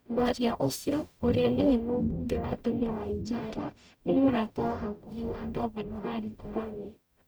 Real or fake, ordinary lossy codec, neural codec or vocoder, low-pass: fake; none; codec, 44.1 kHz, 0.9 kbps, DAC; none